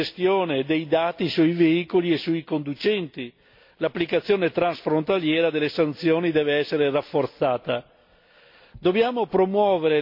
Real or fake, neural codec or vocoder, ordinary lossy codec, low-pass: real; none; MP3, 32 kbps; 5.4 kHz